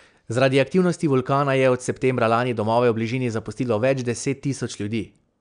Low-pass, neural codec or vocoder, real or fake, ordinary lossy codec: 9.9 kHz; none; real; none